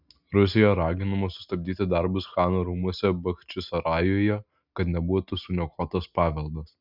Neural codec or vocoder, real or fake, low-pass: none; real; 5.4 kHz